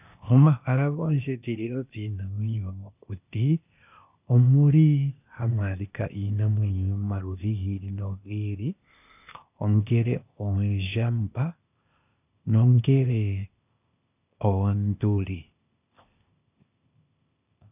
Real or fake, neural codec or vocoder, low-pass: fake; codec, 16 kHz, 0.8 kbps, ZipCodec; 3.6 kHz